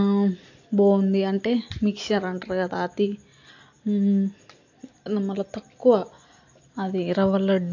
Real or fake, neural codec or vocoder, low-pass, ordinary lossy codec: real; none; 7.2 kHz; AAC, 48 kbps